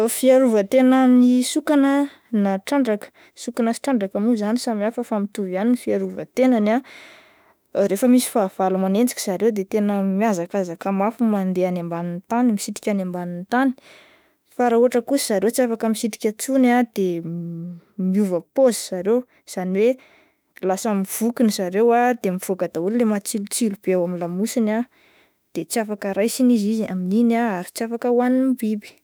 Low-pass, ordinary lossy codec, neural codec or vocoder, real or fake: none; none; autoencoder, 48 kHz, 32 numbers a frame, DAC-VAE, trained on Japanese speech; fake